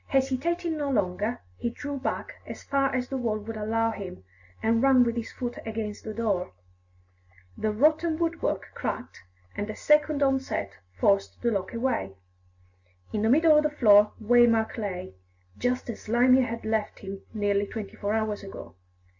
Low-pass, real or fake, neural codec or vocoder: 7.2 kHz; real; none